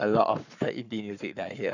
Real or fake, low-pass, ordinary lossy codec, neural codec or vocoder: fake; 7.2 kHz; none; codec, 44.1 kHz, 7.8 kbps, Pupu-Codec